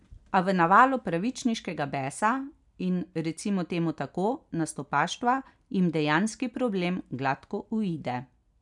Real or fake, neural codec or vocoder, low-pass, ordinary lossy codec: real; none; 10.8 kHz; none